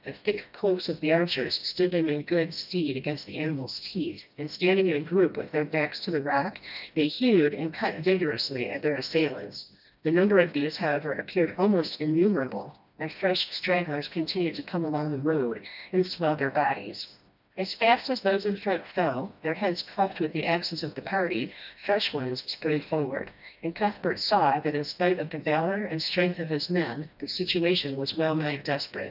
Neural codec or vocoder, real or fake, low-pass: codec, 16 kHz, 1 kbps, FreqCodec, smaller model; fake; 5.4 kHz